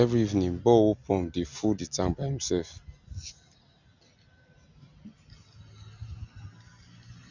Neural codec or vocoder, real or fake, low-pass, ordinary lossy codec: none; real; 7.2 kHz; none